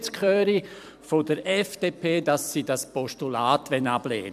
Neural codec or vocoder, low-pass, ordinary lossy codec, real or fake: vocoder, 44.1 kHz, 128 mel bands every 256 samples, BigVGAN v2; 14.4 kHz; MP3, 96 kbps; fake